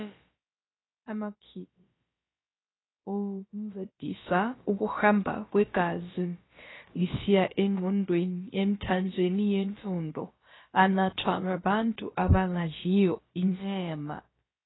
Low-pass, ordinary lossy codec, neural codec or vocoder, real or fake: 7.2 kHz; AAC, 16 kbps; codec, 16 kHz, about 1 kbps, DyCAST, with the encoder's durations; fake